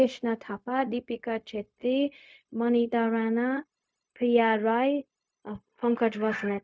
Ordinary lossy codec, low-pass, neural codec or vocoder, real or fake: none; none; codec, 16 kHz, 0.4 kbps, LongCat-Audio-Codec; fake